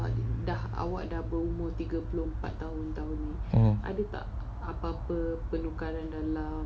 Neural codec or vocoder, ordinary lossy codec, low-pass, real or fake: none; none; none; real